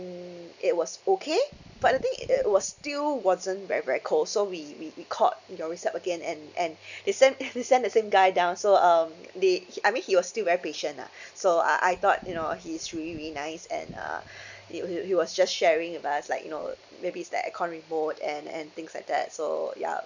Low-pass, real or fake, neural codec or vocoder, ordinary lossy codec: 7.2 kHz; real; none; none